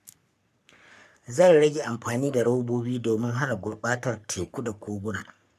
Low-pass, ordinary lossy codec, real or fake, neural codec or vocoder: 14.4 kHz; none; fake; codec, 44.1 kHz, 3.4 kbps, Pupu-Codec